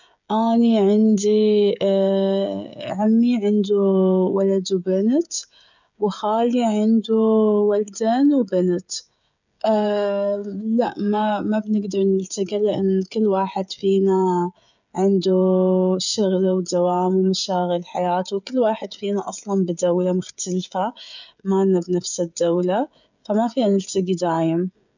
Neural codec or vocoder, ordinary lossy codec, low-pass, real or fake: codec, 16 kHz, 16 kbps, FreqCodec, smaller model; none; 7.2 kHz; fake